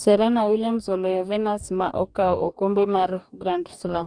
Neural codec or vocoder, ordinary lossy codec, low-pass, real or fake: codec, 44.1 kHz, 2.6 kbps, DAC; none; 9.9 kHz; fake